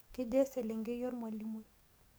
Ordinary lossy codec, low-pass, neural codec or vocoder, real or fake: none; none; codec, 44.1 kHz, 7.8 kbps, DAC; fake